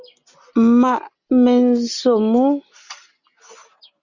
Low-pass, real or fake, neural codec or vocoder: 7.2 kHz; real; none